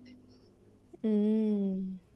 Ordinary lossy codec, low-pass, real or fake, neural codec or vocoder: Opus, 16 kbps; 19.8 kHz; fake; autoencoder, 48 kHz, 128 numbers a frame, DAC-VAE, trained on Japanese speech